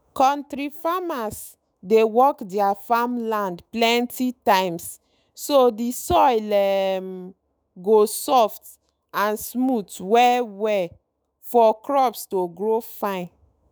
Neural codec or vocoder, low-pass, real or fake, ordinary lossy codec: autoencoder, 48 kHz, 128 numbers a frame, DAC-VAE, trained on Japanese speech; none; fake; none